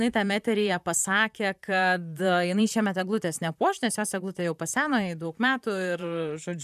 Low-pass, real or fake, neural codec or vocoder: 14.4 kHz; fake; vocoder, 44.1 kHz, 128 mel bands, Pupu-Vocoder